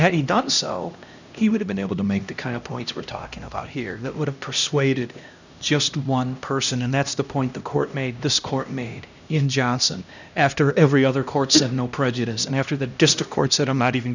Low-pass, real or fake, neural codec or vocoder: 7.2 kHz; fake; codec, 16 kHz, 1 kbps, X-Codec, HuBERT features, trained on LibriSpeech